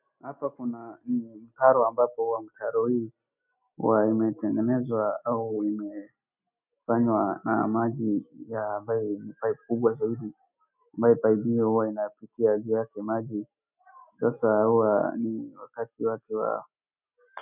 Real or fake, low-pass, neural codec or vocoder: real; 3.6 kHz; none